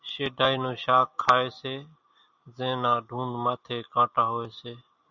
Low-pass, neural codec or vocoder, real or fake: 7.2 kHz; none; real